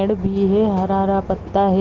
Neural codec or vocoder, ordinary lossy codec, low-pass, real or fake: none; Opus, 16 kbps; 7.2 kHz; real